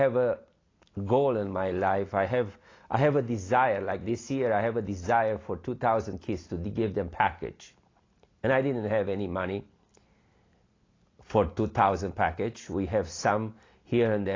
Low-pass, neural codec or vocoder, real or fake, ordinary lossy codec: 7.2 kHz; none; real; AAC, 32 kbps